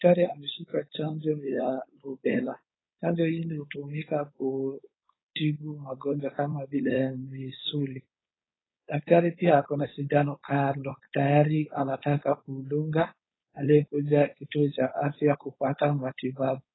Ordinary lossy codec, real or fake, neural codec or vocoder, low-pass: AAC, 16 kbps; fake; codec, 16 kHz, 4.8 kbps, FACodec; 7.2 kHz